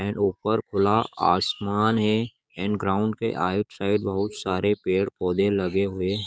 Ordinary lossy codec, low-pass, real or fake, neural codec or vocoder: none; none; fake; codec, 16 kHz, 6 kbps, DAC